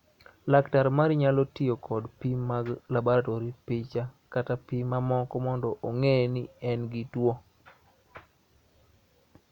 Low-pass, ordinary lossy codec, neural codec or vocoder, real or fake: 19.8 kHz; none; none; real